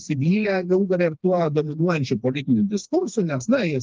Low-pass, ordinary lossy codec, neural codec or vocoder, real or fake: 7.2 kHz; Opus, 24 kbps; codec, 16 kHz, 2 kbps, FreqCodec, smaller model; fake